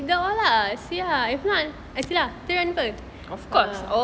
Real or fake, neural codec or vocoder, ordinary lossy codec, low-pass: real; none; none; none